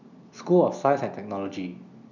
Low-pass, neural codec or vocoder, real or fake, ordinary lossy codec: 7.2 kHz; none; real; none